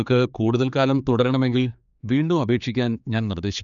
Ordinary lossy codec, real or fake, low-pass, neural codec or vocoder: none; fake; 7.2 kHz; codec, 16 kHz, 4 kbps, X-Codec, HuBERT features, trained on general audio